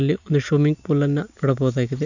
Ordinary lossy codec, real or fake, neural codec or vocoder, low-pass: none; real; none; 7.2 kHz